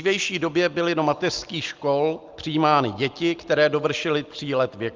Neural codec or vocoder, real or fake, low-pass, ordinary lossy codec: none; real; 7.2 kHz; Opus, 24 kbps